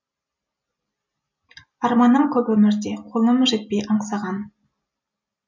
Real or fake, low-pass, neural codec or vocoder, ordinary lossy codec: real; 7.2 kHz; none; MP3, 64 kbps